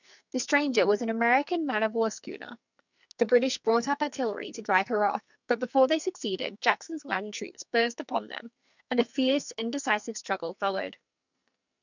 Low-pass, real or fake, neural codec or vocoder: 7.2 kHz; fake; codec, 44.1 kHz, 2.6 kbps, SNAC